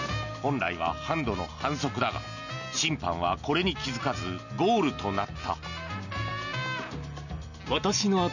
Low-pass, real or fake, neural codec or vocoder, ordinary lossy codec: 7.2 kHz; real; none; none